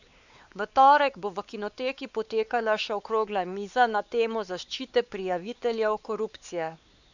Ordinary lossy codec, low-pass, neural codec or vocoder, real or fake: none; 7.2 kHz; codec, 16 kHz, 4 kbps, X-Codec, WavLM features, trained on Multilingual LibriSpeech; fake